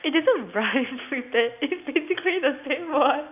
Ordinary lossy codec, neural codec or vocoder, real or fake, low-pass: none; none; real; 3.6 kHz